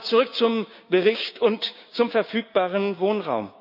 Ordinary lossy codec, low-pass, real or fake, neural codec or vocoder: none; 5.4 kHz; fake; codec, 16 kHz, 6 kbps, DAC